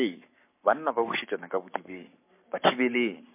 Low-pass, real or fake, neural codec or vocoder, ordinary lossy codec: 3.6 kHz; fake; codec, 44.1 kHz, 7.8 kbps, Pupu-Codec; MP3, 24 kbps